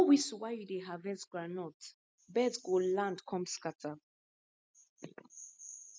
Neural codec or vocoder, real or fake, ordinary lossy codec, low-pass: none; real; none; none